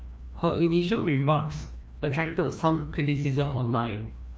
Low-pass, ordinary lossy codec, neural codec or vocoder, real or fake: none; none; codec, 16 kHz, 1 kbps, FreqCodec, larger model; fake